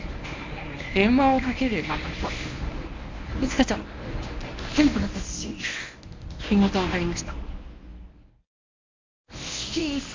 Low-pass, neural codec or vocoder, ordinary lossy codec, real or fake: 7.2 kHz; codec, 24 kHz, 0.9 kbps, WavTokenizer, medium speech release version 1; MP3, 64 kbps; fake